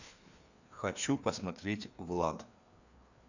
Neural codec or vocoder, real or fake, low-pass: codec, 16 kHz, 2 kbps, FreqCodec, larger model; fake; 7.2 kHz